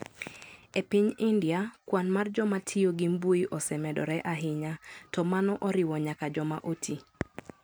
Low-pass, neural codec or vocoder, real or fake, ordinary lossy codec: none; none; real; none